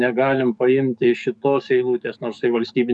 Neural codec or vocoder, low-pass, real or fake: codec, 44.1 kHz, 7.8 kbps, DAC; 10.8 kHz; fake